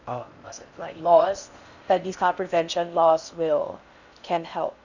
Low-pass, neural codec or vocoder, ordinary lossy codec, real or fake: 7.2 kHz; codec, 16 kHz in and 24 kHz out, 0.6 kbps, FocalCodec, streaming, 4096 codes; none; fake